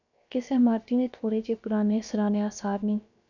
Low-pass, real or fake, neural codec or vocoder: 7.2 kHz; fake; codec, 16 kHz, about 1 kbps, DyCAST, with the encoder's durations